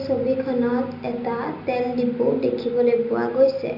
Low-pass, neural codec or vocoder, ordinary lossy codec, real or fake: 5.4 kHz; none; none; real